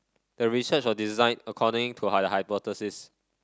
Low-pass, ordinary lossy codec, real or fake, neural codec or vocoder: none; none; real; none